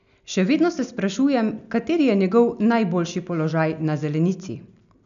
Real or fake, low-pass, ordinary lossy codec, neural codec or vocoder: real; 7.2 kHz; none; none